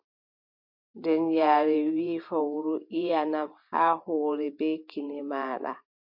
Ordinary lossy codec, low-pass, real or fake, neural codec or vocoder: MP3, 32 kbps; 5.4 kHz; fake; vocoder, 44.1 kHz, 128 mel bands every 512 samples, BigVGAN v2